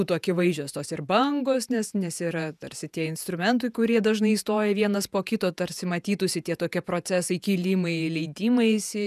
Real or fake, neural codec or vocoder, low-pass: fake; vocoder, 48 kHz, 128 mel bands, Vocos; 14.4 kHz